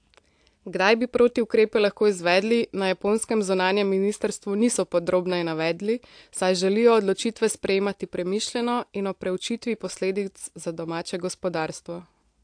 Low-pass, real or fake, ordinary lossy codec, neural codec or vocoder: 9.9 kHz; real; AAC, 64 kbps; none